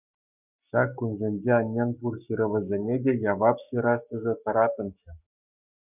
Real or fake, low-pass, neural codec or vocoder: fake; 3.6 kHz; codec, 44.1 kHz, 7.8 kbps, Pupu-Codec